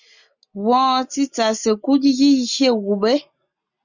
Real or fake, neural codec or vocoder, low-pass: real; none; 7.2 kHz